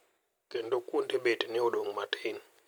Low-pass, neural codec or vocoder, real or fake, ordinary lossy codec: none; none; real; none